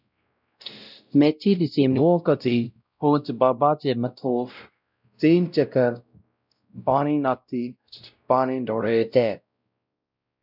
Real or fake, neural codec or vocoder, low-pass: fake; codec, 16 kHz, 0.5 kbps, X-Codec, WavLM features, trained on Multilingual LibriSpeech; 5.4 kHz